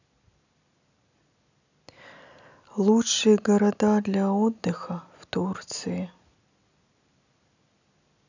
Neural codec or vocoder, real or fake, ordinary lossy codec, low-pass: none; real; none; 7.2 kHz